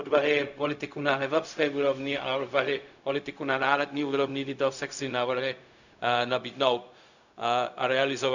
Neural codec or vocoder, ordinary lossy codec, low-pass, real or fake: codec, 16 kHz, 0.4 kbps, LongCat-Audio-Codec; none; 7.2 kHz; fake